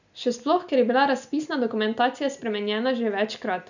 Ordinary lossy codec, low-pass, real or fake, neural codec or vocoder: none; 7.2 kHz; real; none